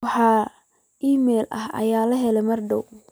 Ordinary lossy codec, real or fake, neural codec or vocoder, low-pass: none; real; none; none